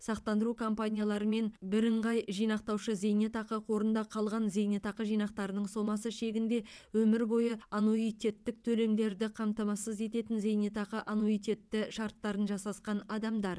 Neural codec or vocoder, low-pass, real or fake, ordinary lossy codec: vocoder, 22.05 kHz, 80 mel bands, WaveNeXt; none; fake; none